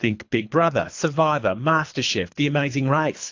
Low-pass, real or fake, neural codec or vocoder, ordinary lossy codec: 7.2 kHz; fake; codec, 24 kHz, 3 kbps, HILCodec; AAC, 48 kbps